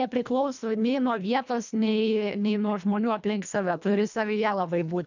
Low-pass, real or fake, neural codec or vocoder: 7.2 kHz; fake; codec, 24 kHz, 1.5 kbps, HILCodec